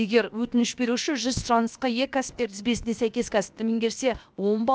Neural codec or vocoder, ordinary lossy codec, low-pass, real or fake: codec, 16 kHz, 0.7 kbps, FocalCodec; none; none; fake